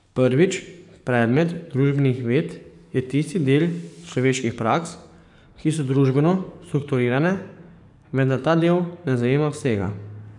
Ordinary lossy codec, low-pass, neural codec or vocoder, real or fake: none; 10.8 kHz; codec, 44.1 kHz, 7.8 kbps, DAC; fake